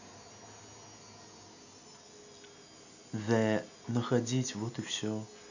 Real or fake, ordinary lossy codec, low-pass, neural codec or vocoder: real; none; 7.2 kHz; none